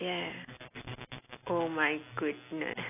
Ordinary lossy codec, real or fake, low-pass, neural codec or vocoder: AAC, 24 kbps; real; 3.6 kHz; none